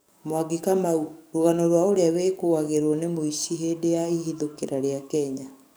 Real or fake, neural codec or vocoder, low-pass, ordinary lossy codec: fake; codec, 44.1 kHz, 7.8 kbps, DAC; none; none